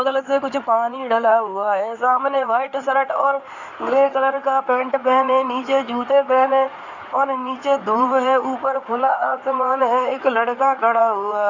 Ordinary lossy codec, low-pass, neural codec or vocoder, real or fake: none; 7.2 kHz; codec, 16 kHz in and 24 kHz out, 2.2 kbps, FireRedTTS-2 codec; fake